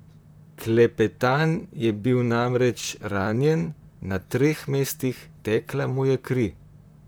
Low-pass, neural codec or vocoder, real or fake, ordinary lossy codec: none; vocoder, 44.1 kHz, 128 mel bands, Pupu-Vocoder; fake; none